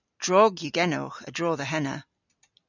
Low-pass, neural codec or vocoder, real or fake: 7.2 kHz; none; real